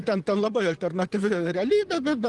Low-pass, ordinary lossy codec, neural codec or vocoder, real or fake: 10.8 kHz; Opus, 24 kbps; vocoder, 44.1 kHz, 128 mel bands, Pupu-Vocoder; fake